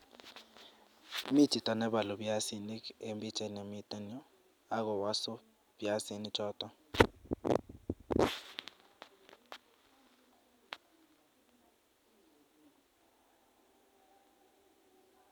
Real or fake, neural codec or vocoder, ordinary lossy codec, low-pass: real; none; none; none